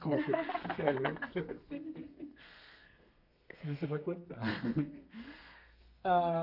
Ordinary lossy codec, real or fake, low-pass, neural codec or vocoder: none; fake; 5.4 kHz; codec, 32 kHz, 1.9 kbps, SNAC